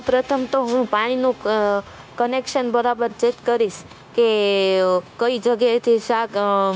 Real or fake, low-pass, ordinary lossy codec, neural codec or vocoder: fake; none; none; codec, 16 kHz, 0.9 kbps, LongCat-Audio-Codec